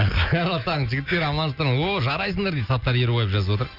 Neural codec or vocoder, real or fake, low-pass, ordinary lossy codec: none; real; 5.4 kHz; none